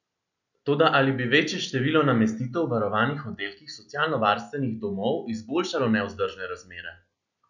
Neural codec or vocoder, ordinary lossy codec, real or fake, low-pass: none; none; real; 7.2 kHz